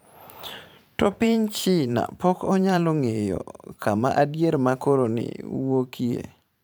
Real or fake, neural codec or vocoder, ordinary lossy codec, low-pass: fake; vocoder, 44.1 kHz, 128 mel bands every 512 samples, BigVGAN v2; none; none